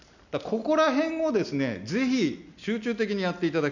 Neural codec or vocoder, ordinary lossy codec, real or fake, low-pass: none; none; real; 7.2 kHz